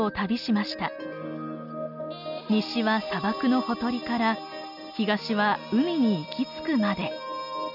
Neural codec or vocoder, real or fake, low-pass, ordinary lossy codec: none; real; 5.4 kHz; none